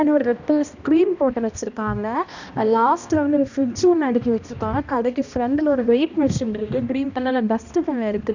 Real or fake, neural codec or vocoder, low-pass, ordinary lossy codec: fake; codec, 16 kHz, 1 kbps, X-Codec, HuBERT features, trained on balanced general audio; 7.2 kHz; none